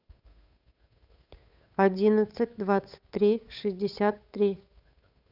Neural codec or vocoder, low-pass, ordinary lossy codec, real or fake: codec, 16 kHz, 8 kbps, FunCodec, trained on Chinese and English, 25 frames a second; 5.4 kHz; none; fake